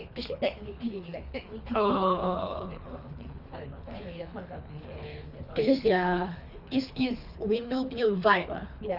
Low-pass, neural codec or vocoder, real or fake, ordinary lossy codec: 5.4 kHz; codec, 24 kHz, 3 kbps, HILCodec; fake; Opus, 64 kbps